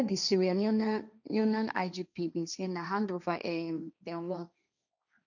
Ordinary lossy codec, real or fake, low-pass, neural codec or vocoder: none; fake; 7.2 kHz; codec, 16 kHz, 1.1 kbps, Voila-Tokenizer